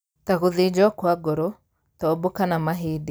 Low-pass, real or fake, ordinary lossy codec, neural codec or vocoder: none; real; none; none